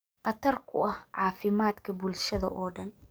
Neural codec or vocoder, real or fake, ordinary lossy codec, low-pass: codec, 44.1 kHz, 7.8 kbps, DAC; fake; none; none